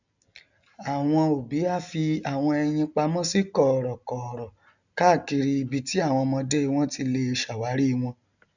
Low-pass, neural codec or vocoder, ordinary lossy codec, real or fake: 7.2 kHz; none; none; real